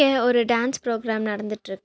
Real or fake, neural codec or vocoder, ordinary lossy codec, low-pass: real; none; none; none